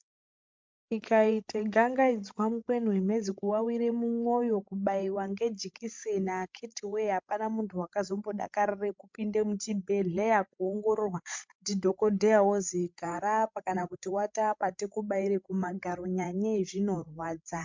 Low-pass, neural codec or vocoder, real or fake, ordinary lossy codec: 7.2 kHz; codec, 16 kHz, 16 kbps, FreqCodec, larger model; fake; MP3, 64 kbps